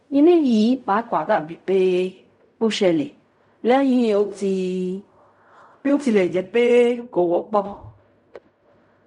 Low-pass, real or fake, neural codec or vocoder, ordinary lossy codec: 10.8 kHz; fake; codec, 16 kHz in and 24 kHz out, 0.4 kbps, LongCat-Audio-Codec, fine tuned four codebook decoder; MP3, 64 kbps